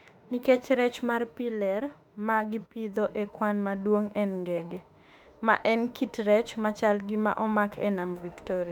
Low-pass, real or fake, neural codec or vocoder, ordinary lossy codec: 19.8 kHz; fake; autoencoder, 48 kHz, 32 numbers a frame, DAC-VAE, trained on Japanese speech; none